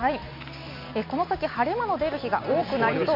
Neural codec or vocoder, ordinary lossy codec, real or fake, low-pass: none; MP3, 32 kbps; real; 5.4 kHz